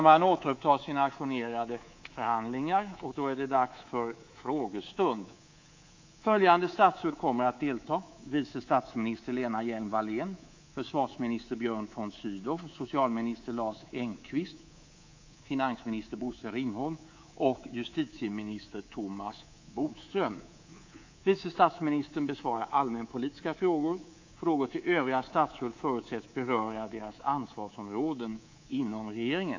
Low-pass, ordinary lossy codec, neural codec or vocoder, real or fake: 7.2 kHz; AAC, 48 kbps; codec, 24 kHz, 3.1 kbps, DualCodec; fake